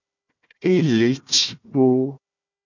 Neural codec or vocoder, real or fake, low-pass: codec, 16 kHz, 1 kbps, FunCodec, trained on Chinese and English, 50 frames a second; fake; 7.2 kHz